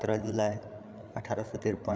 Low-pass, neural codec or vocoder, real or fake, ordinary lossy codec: none; codec, 16 kHz, 8 kbps, FreqCodec, larger model; fake; none